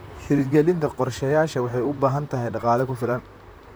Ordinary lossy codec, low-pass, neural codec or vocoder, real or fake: none; none; vocoder, 44.1 kHz, 128 mel bands, Pupu-Vocoder; fake